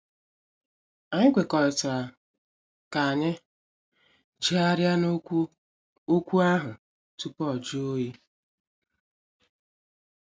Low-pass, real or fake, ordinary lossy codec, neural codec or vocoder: none; real; none; none